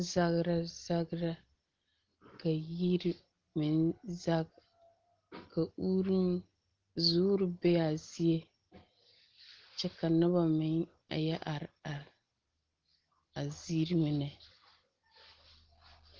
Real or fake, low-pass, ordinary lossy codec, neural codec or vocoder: real; 7.2 kHz; Opus, 16 kbps; none